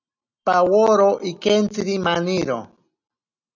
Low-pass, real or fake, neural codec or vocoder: 7.2 kHz; real; none